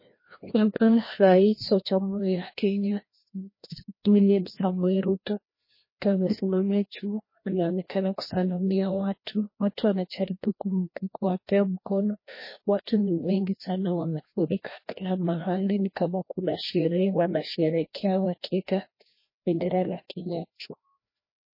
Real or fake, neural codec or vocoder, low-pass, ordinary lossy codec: fake; codec, 16 kHz, 1 kbps, FreqCodec, larger model; 5.4 kHz; MP3, 24 kbps